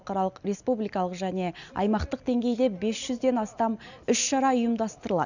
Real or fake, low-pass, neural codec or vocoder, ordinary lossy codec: real; 7.2 kHz; none; none